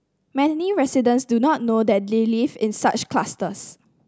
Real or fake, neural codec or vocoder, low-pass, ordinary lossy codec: real; none; none; none